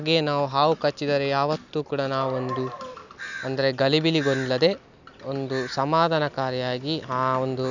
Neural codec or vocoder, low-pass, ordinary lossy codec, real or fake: none; 7.2 kHz; none; real